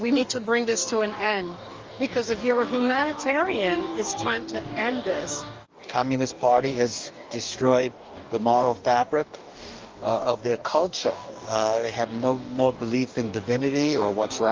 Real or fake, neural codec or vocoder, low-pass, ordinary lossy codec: fake; codec, 44.1 kHz, 2.6 kbps, DAC; 7.2 kHz; Opus, 32 kbps